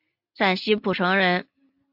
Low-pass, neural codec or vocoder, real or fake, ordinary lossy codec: 5.4 kHz; none; real; AAC, 48 kbps